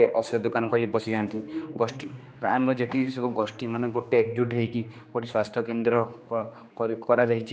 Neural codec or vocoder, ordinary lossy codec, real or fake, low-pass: codec, 16 kHz, 2 kbps, X-Codec, HuBERT features, trained on general audio; none; fake; none